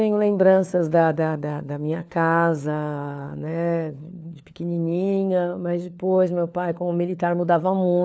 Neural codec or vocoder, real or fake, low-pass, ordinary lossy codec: codec, 16 kHz, 4 kbps, FunCodec, trained on LibriTTS, 50 frames a second; fake; none; none